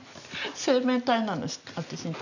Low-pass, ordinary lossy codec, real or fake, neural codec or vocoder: 7.2 kHz; none; real; none